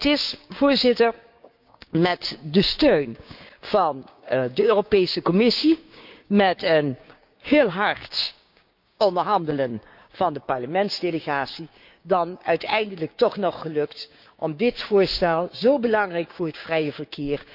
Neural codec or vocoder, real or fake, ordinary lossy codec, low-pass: codec, 16 kHz, 6 kbps, DAC; fake; none; 5.4 kHz